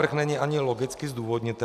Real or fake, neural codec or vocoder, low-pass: real; none; 14.4 kHz